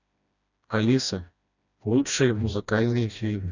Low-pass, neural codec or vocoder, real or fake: 7.2 kHz; codec, 16 kHz, 1 kbps, FreqCodec, smaller model; fake